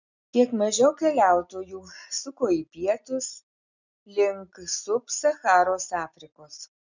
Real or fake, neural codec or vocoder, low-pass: real; none; 7.2 kHz